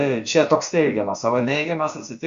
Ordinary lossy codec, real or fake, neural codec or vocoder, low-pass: Opus, 64 kbps; fake; codec, 16 kHz, about 1 kbps, DyCAST, with the encoder's durations; 7.2 kHz